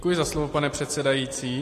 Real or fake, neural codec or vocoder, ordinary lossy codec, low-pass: real; none; AAC, 48 kbps; 14.4 kHz